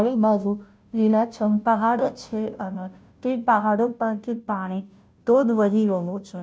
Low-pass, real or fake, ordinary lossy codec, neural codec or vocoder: none; fake; none; codec, 16 kHz, 0.5 kbps, FunCodec, trained on Chinese and English, 25 frames a second